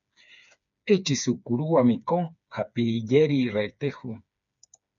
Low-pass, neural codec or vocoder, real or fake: 7.2 kHz; codec, 16 kHz, 4 kbps, FreqCodec, smaller model; fake